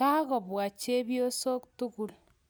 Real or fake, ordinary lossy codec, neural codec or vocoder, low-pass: real; none; none; none